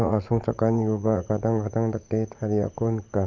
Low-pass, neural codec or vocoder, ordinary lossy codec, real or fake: 7.2 kHz; vocoder, 44.1 kHz, 80 mel bands, Vocos; Opus, 32 kbps; fake